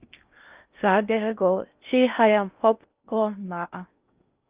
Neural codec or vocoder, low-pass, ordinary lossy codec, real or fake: codec, 16 kHz in and 24 kHz out, 0.6 kbps, FocalCodec, streaming, 4096 codes; 3.6 kHz; Opus, 24 kbps; fake